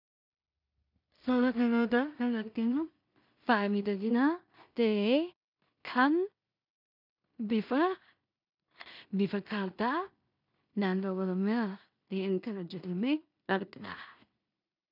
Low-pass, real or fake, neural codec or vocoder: 5.4 kHz; fake; codec, 16 kHz in and 24 kHz out, 0.4 kbps, LongCat-Audio-Codec, two codebook decoder